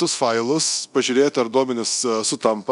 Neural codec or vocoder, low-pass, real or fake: codec, 24 kHz, 0.9 kbps, DualCodec; 10.8 kHz; fake